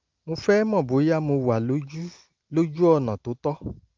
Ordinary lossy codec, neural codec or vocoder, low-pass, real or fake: Opus, 24 kbps; none; 7.2 kHz; real